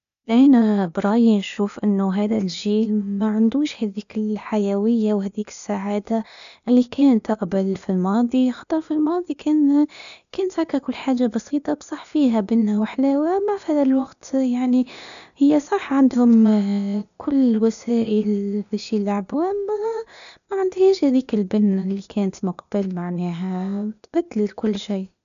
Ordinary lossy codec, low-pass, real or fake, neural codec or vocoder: none; 7.2 kHz; fake; codec, 16 kHz, 0.8 kbps, ZipCodec